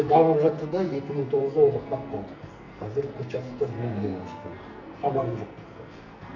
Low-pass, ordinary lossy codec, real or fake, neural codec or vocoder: 7.2 kHz; none; fake; codec, 44.1 kHz, 2.6 kbps, SNAC